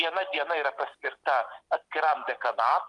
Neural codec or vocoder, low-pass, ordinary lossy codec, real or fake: none; 10.8 kHz; Opus, 32 kbps; real